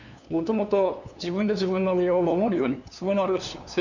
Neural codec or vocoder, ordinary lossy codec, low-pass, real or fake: codec, 16 kHz, 2 kbps, FunCodec, trained on LibriTTS, 25 frames a second; none; 7.2 kHz; fake